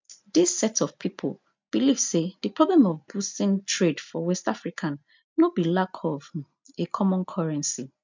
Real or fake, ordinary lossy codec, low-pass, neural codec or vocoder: real; MP3, 64 kbps; 7.2 kHz; none